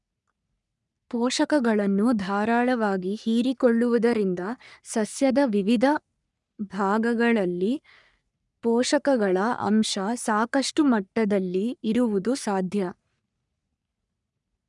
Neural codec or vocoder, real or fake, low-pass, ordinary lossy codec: codec, 44.1 kHz, 3.4 kbps, Pupu-Codec; fake; 10.8 kHz; none